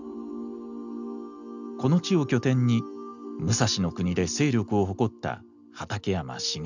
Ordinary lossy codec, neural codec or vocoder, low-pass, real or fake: none; none; 7.2 kHz; real